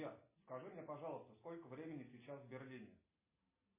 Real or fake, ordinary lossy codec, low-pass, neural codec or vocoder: real; MP3, 16 kbps; 3.6 kHz; none